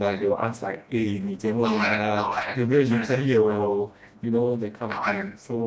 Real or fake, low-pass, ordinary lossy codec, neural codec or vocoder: fake; none; none; codec, 16 kHz, 1 kbps, FreqCodec, smaller model